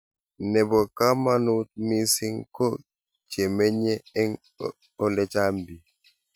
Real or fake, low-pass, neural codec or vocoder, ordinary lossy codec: real; none; none; none